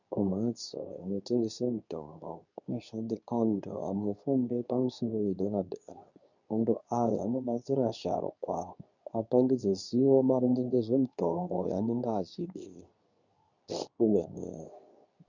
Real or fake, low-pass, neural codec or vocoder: fake; 7.2 kHz; codec, 24 kHz, 0.9 kbps, WavTokenizer, medium speech release version 2